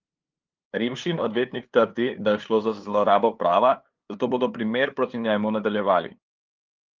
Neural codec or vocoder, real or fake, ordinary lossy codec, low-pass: codec, 16 kHz, 2 kbps, FunCodec, trained on LibriTTS, 25 frames a second; fake; Opus, 32 kbps; 7.2 kHz